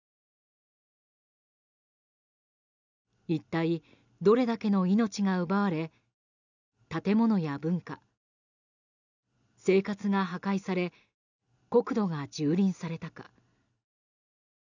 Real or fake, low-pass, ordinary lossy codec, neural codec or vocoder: real; 7.2 kHz; none; none